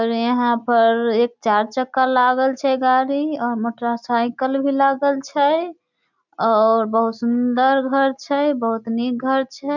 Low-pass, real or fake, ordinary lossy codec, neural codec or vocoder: 7.2 kHz; real; none; none